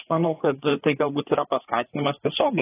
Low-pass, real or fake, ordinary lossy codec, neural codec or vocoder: 3.6 kHz; fake; AAC, 16 kbps; codec, 16 kHz, 4 kbps, FreqCodec, larger model